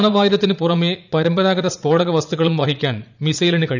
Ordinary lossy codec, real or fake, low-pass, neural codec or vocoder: none; fake; 7.2 kHz; vocoder, 44.1 kHz, 80 mel bands, Vocos